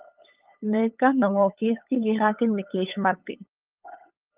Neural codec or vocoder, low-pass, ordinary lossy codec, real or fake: codec, 16 kHz, 8 kbps, FunCodec, trained on LibriTTS, 25 frames a second; 3.6 kHz; Opus, 32 kbps; fake